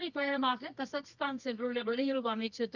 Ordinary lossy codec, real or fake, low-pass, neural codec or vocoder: none; fake; 7.2 kHz; codec, 24 kHz, 0.9 kbps, WavTokenizer, medium music audio release